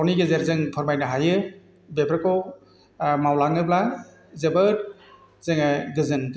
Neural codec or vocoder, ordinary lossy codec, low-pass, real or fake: none; none; none; real